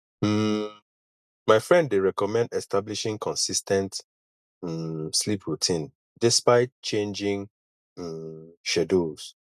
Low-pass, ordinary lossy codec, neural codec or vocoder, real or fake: 14.4 kHz; none; none; real